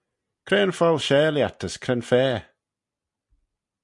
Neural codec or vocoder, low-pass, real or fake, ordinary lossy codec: none; 10.8 kHz; real; MP3, 64 kbps